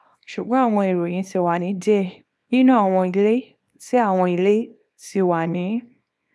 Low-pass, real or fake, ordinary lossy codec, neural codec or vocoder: none; fake; none; codec, 24 kHz, 0.9 kbps, WavTokenizer, small release